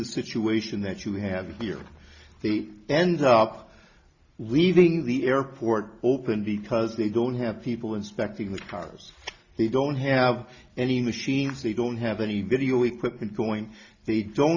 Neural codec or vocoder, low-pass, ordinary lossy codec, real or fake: none; 7.2 kHz; Opus, 64 kbps; real